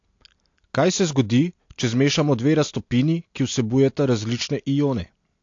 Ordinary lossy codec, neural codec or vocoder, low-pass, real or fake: AAC, 48 kbps; none; 7.2 kHz; real